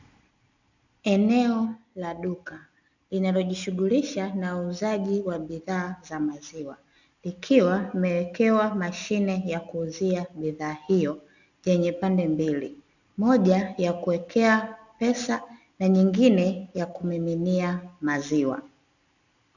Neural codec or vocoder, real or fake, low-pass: none; real; 7.2 kHz